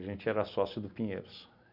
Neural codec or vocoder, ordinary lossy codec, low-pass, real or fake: none; none; 5.4 kHz; real